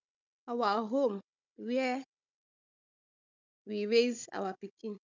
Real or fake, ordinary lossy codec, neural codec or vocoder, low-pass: fake; none; codec, 16 kHz, 16 kbps, FunCodec, trained on Chinese and English, 50 frames a second; 7.2 kHz